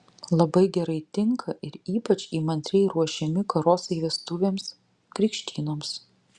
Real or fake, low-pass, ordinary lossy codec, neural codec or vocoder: real; 10.8 kHz; Opus, 64 kbps; none